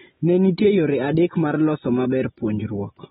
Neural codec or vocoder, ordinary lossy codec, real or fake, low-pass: none; AAC, 16 kbps; real; 10.8 kHz